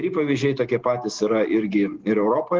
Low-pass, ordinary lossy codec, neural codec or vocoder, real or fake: 7.2 kHz; Opus, 16 kbps; none; real